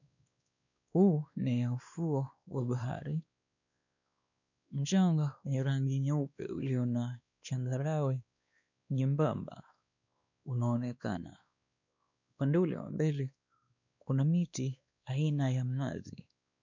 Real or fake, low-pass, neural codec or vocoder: fake; 7.2 kHz; codec, 16 kHz, 2 kbps, X-Codec, WavLM features, trained on Multilingual LibriSpeech